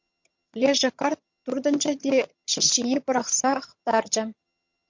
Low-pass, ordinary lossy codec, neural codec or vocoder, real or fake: 7.2 kHz; MP3, 48 kbps; vocoder, 22.05 kHz, 80 mel bands, HiFi-GAN; fake